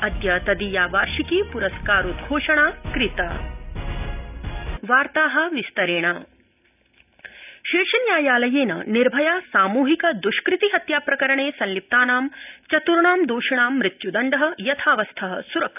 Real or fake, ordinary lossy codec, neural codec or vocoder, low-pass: real; none; none; 3.6 kHz